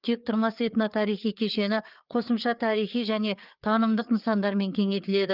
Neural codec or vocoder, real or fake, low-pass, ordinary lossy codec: codec, 16 kHz, 4 kbps, FreqCodec, larger model; fake; 5.4 kHz; Opus, 24 kbps